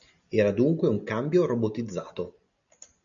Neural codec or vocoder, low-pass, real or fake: none; 7.2 kHz; real